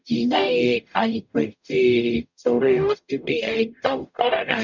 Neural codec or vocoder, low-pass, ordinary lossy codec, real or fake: codec, 44.1 kHz, 0.9 kbps, DAC; 7.2 kHz; none; fake